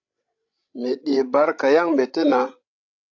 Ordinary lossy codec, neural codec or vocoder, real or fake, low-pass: AAC, 48 kbps; codec, 16 kHz, 16 kbps, FreqCodec, larger model; fake; 7.2 kHz